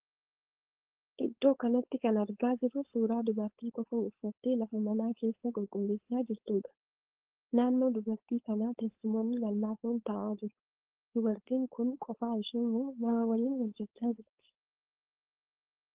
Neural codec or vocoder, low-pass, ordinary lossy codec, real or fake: codec, 16 kHz, 4.8 kbps, FACodec; 3.6 kHz; Opus, 16 kbps; fake